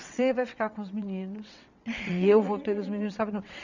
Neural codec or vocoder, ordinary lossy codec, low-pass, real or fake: vocoder, 22.05 kHz, 80 mel bands, WaveNeXt; none; 7.2 kHz; fake